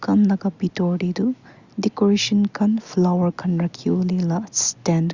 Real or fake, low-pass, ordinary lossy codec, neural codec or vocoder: real; 7.2 kHz; Opus, 64 kbps; none